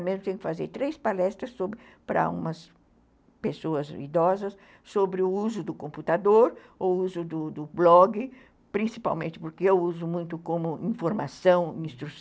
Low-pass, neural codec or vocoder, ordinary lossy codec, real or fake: none; none; none; real